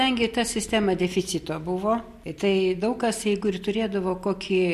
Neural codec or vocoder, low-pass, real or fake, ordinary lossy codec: none; 14.4 kHz; real; MP3, 64 kbps